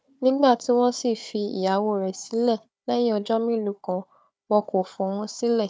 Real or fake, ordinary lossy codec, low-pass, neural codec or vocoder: fake; none; none; codec, 16 kHz, 4 kbps, FunCodec, trained on Chinese and English, 50 frames a second